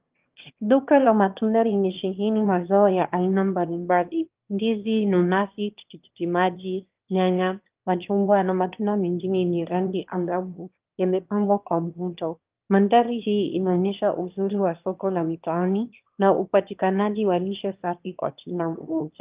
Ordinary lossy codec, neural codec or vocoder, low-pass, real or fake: Opus, 32 kbps; autoencoder, 22.05 kHz, a latent of 192 numbers a frame, VITS, trained on one speaker; 3.6 kHz; fake